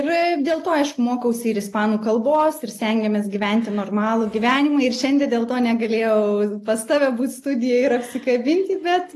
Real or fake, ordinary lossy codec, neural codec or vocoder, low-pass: real; AAC, 48 kbps; none; 14.4 kHz